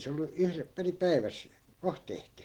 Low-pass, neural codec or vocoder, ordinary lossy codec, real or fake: 19.8 kHz; none; Opus, 16 kbps; real